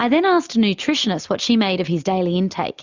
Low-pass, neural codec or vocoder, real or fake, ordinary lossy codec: 7.2 kHz; none; real; Opus, 64 kbps